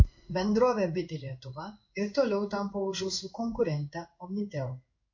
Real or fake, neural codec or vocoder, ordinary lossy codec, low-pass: fake; codec, 16 kHz in and 24 kHz out, 1 kbps, XY-Tokenizer; MP3, 48 kbps; 7.2 kHz